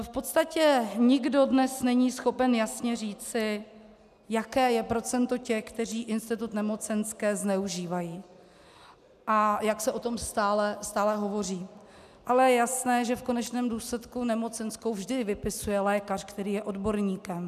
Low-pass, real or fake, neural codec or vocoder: 14.4 kHz; real; none